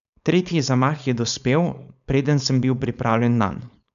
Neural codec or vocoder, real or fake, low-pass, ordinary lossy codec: codec, 16 kHz, 4.8 kbps, FACodec; fake; 7.2 kHz; none